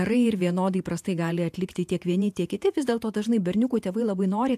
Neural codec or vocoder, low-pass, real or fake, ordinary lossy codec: vocoder, 48 kHz, 128 mel bands, Vocos; 14.4 kHz; fake; AAC, 96 kbps